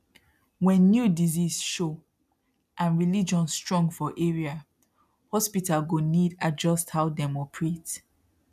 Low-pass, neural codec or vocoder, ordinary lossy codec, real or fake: 14.4 kHz; none; none; real